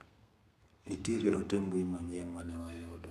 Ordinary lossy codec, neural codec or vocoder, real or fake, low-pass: none; codec, 32 kHz, 1.9 kbps, SNAC; fake; 14.4 kHz